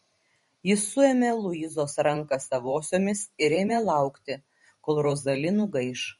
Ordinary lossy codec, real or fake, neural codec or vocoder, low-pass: MP3, 48 kbps; fake; vocoder, 44.1 kHz, 128 mel bands every 512 samples, BigVGAN v2; 19.8 kHz